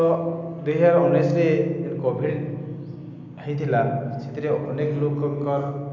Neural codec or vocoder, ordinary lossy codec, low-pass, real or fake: none; none; 7.2 kHz; real